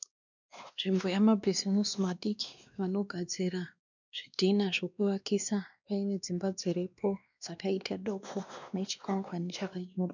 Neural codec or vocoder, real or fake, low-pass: codec, 16 kHz, 2 kbps, X-Codec, WavLM features, trained on Multilingual LibriSpeech; fake; 7.2 kHz